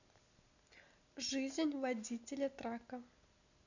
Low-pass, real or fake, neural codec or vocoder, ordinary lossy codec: 7.2 kHz; real; none; none